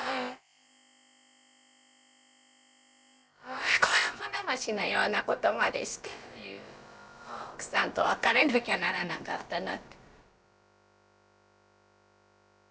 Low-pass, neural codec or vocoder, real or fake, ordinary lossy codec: none; codec, 16 kHz, about 1 kbps, DyCAST, with the encoder's durations; fake; none